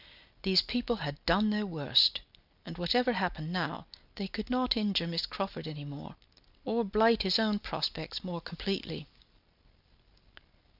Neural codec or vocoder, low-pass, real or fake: none; 5.4 kHz; real